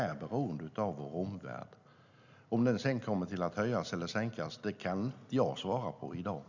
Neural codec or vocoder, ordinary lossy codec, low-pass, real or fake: none; none; 7.2 kHz; real